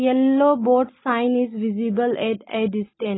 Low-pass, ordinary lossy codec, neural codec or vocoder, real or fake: 7.2 kHz; AAC, 16 kbps; none; real